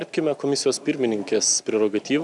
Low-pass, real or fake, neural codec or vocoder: 10.8 kHz; real; none